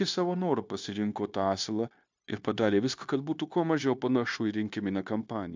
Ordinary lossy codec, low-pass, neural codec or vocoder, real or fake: MP3, 64 kbps; 7.2 kHz; codec, 16 kHz, 0.9 kbps, LongCat-Audio-Codec; fake